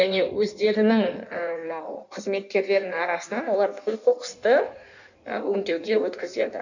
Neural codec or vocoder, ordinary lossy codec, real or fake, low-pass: codec, 16 kHz in and 24 kHz out, 1.1 kbps, FireRedTTS-2 codec; none; fake; 7.2 kHz